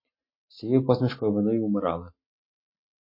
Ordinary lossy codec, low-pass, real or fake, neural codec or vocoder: MP3, 24 kbps; 5.4 kHz; real; none